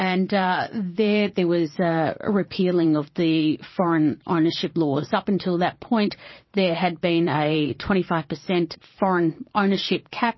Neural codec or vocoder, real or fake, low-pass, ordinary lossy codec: vocoder, 22.05 kHz, 80 mel bands, Vocos; fake; 7.2 kHz; MP3, 24 kbps